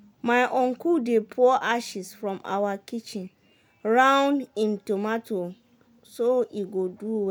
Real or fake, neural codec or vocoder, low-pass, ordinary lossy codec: real; none; none; none